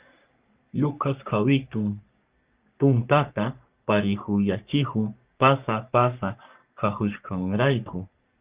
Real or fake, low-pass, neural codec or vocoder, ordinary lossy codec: fake; 3.6 kHz; codec, 44.1 kHz, 3.4 kbps, Pupu-Codec; Opus, 32 kbps